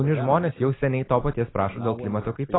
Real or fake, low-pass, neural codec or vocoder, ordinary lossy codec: real; 7.2 kHz; none; AAC, 16 kbps